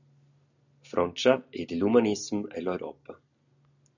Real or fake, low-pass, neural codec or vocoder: real; 7.2 kHz; none